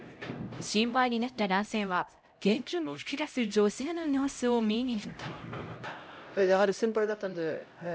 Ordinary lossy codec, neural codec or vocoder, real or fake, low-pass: none; codec, 16 kHz, 0.5 kbps, X-Codec, HuBERT features, trained on LibriSpeech; fake; none